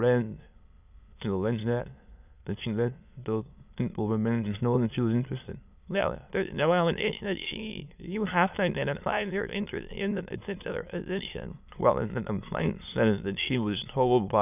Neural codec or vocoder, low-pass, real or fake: autoencoder, 22.05 kHz, a latent of 192 numbers a frame, VITS, trained on many speakers; 3.6 kHz; fake